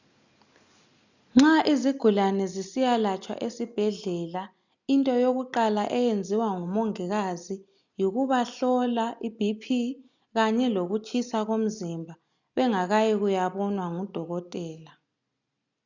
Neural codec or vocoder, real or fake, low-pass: none; real; 7.2 kHz